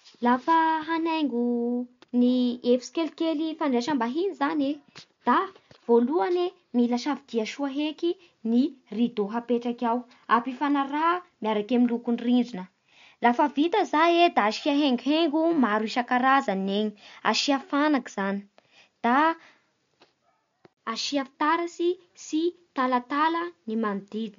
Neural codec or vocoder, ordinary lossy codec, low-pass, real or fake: none; MP3, 48 kbps; 7.2 kHz; real